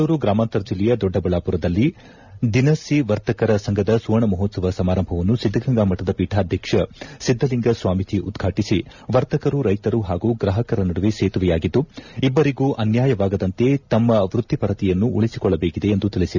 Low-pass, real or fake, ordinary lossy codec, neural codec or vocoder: 7.2 kHz; real; none; none